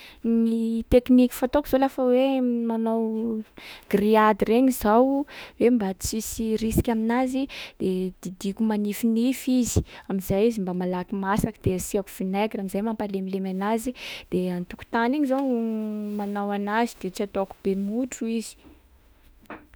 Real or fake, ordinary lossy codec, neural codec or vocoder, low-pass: fake; none; autoencoder, 48 kHz, 32 numbers a frame, DAC-VAE, trained on Japanese speech; none